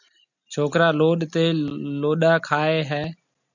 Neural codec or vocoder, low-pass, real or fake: none; 7.2 kHz; real